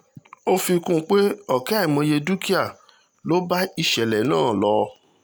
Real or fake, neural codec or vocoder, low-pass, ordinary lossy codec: real; none; none; none